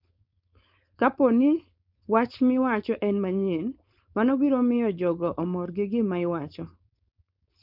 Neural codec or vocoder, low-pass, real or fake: codec, 16 kHz, 4.8 kbps, FACodec; 5.4 kHz; fake